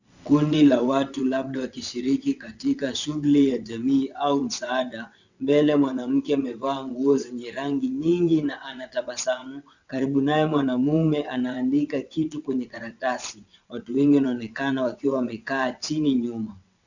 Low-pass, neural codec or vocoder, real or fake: 7.2 kHz; vocoder, 22.05 kHz, 80 mel bands, WaveNeXt; fake